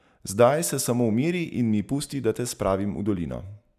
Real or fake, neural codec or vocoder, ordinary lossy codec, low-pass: real; none; none; 14.4 kHz